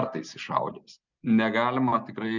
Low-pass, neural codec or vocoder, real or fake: 7.2 kHz; none; real